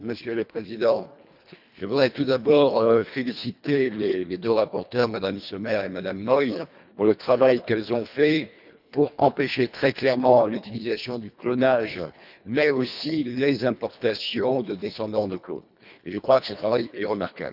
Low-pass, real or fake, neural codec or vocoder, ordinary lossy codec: 5.4 kHz; fake; codec, 24 kHz, 1.5 kbps, HILCodec; none